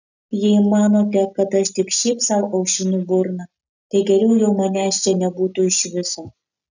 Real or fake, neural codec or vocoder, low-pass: real; none; 7.2 kHz